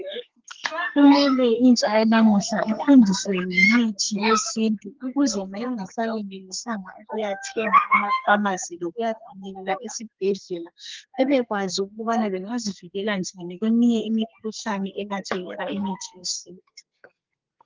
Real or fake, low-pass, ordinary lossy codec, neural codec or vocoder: fake; 7.2 kHz; Opus, 32 kbps; codec, 32 kHz, 1.9 kbps, SNAC